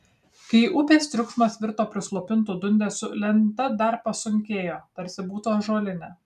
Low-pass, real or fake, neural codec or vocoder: 14.4 kHz; real; none